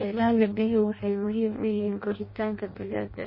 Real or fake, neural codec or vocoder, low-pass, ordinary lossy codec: fake; codec, 16 kHz in and 24 kHz out, 0.6 kbps, FireRedTTS-2 codec; 5.4 kHz; MP3, 24 kbps